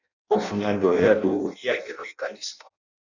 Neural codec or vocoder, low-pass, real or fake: codec, 16 kHz in and 24 kHz out, 0.6 kbps, FireRedTTS-2 codec; 7.2 kHz; fake